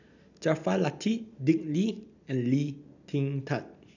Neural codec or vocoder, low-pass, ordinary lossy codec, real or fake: none; 7.2 kHz; none; real